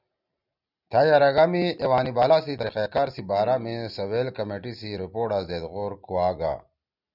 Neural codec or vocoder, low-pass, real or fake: none; 5.4 kHz; real